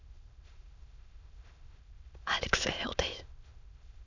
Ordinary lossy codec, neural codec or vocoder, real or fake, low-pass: none; autoencoder, 22.05 kHz, a latent of 192 numbers a frame, VITS, trained on many speakers; fake; 7.2 kHz